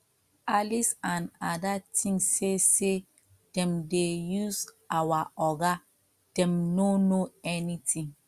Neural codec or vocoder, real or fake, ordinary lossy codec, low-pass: none; real; Opus, 64 kbps; 14.4 kHz